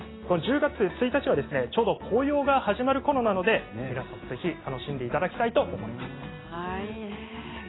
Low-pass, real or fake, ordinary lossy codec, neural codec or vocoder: 7.2 kHz; real; AAC, 16 kbps; none